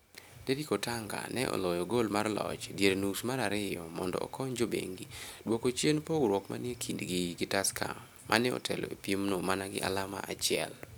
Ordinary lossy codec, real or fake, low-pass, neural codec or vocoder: none; real; none; none